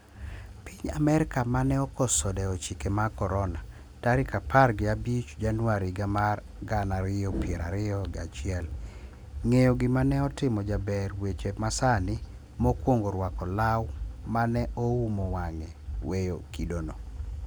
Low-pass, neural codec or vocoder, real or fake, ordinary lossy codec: none; none; real; none